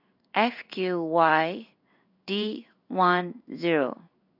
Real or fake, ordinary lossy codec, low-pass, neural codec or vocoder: fake; none; 5.4 kHz; codec, 16 kHz in and 24 kHz out, 1 kbps, XY-Tokenizer